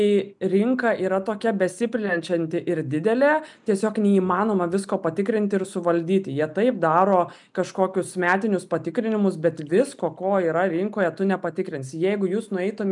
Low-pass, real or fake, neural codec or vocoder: 10.8 kHz; real; none